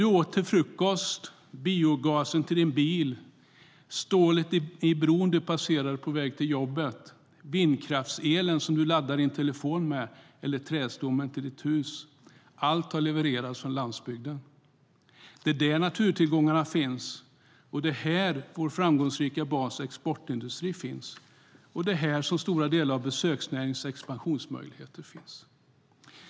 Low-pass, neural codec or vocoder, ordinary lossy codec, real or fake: none; none; none; real